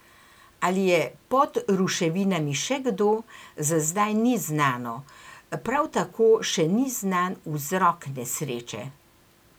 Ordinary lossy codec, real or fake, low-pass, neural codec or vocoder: none; real; none; none